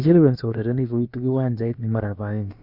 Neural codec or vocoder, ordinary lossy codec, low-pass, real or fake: codec, 16 kHz, about 1 kbps, DyCAST, with the encoder's durations; Opus, 64 kbps; 5.4 kHz; fake